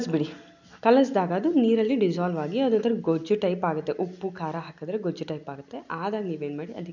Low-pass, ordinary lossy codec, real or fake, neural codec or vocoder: 7.2 kHz; none; real; none